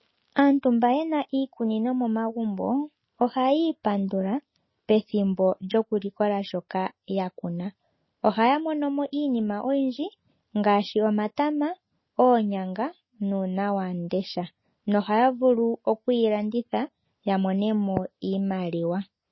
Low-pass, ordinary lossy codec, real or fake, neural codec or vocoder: 7.2 kHz; MP3, 24 kbps; real; none